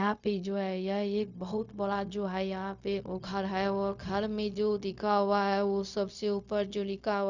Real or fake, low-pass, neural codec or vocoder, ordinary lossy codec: fake; 7.2 kHz; codec, 16 kHz, 0.4 kbps, LongCat-Audio-Codec; none